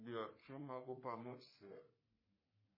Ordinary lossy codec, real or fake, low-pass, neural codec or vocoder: MP3, 24 kbps; fake; 5.4 kHz; codec, 44.1 kHz, 3.4 kbps, Pupu-Codec